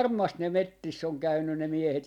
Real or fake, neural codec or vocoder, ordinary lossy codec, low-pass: real; none; none; 19.8 kHz